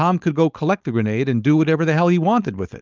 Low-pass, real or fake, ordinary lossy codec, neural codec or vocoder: 7.2 kHz; fake; Opus, 24 kbps; codec, 16 kHz, 4.8 kbps, FACodec